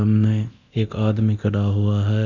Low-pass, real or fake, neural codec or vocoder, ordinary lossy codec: 7.2 kHz; fake; codec, 24 kHz, 0.9 kbps, DualCodec; none